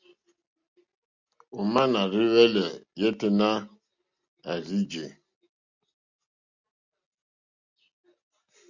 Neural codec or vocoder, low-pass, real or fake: none; 7.2 kHz; real